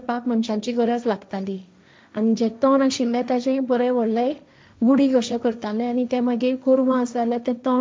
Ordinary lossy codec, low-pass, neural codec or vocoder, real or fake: none; none; codec, 16 kHz, 1.1 kbps, Voila-Tokenizer; fake